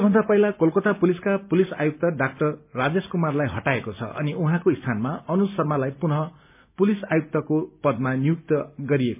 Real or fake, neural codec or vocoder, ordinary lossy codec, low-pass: real; none; MP3, 32 kbps; 3.6 kHz